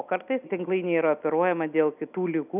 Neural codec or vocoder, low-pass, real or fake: none; 3.6 kHz; real